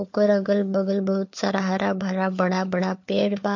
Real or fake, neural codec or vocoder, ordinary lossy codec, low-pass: fake; codec, 16 kHz, 4 kbps, FunCodec, trained on LibriTTS, 50 frames a second; MP3, 48 kbps; 7.2 kHz